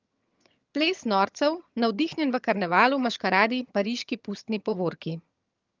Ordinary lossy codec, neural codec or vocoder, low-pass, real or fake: Opus, 24 kbps; vocoder, 22.05 kHz, 80 mel bands, HiFi-GAN; 7.2 kHz; fake